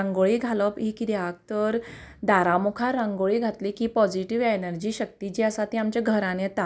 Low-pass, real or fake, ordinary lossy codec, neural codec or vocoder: none; real; none; none